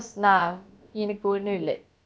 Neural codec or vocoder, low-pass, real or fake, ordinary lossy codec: codec, 16 kHz, about 1 kbps, DyCAST, with the encoder's durations; none; fake; none